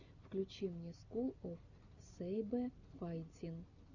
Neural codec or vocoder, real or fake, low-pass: none; real; 7.2 kHz